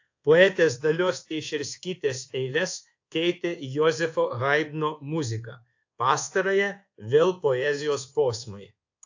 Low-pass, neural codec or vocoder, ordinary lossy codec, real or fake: 7.2 kHz; codec, 24 kHz, 1.2 kbps, DualCodec; AAC, 48 kbps; fake